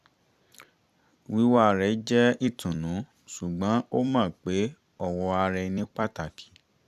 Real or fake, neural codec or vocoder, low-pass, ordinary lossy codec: fake; vocoder, 44.1 kHz, 128 mel bands every 256 samples, BigVGAN v2; 14.4 kHz; none